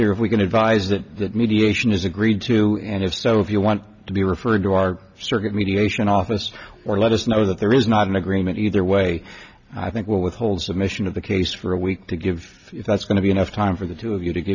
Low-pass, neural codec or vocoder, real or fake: 7.2 kHz; none; real